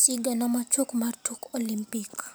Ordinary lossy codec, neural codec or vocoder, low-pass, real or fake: none; none; none; real